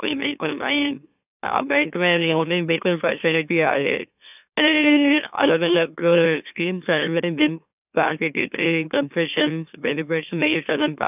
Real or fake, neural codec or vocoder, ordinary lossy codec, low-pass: fake; autoencoder, 44.1 kHz, a latent of 192 numbers a frame, MeloTTS; none; 3.6 kHz